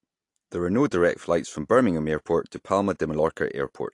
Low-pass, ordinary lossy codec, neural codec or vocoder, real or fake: 10.8 kHz; AAC, 48 kbps; none; real